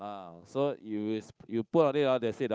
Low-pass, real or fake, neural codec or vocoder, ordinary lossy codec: none; fake; codec, 16 kHz, 8 kbps, FunCodec, trained on Chinese and English, 25 frames a second; none